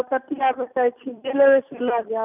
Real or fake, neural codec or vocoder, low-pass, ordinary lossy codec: real; none; 3.6 kHz; none